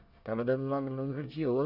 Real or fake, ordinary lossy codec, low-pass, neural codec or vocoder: fake; none; 5.4 kHz; codec, 24 kHz, 1 kbps, SNAC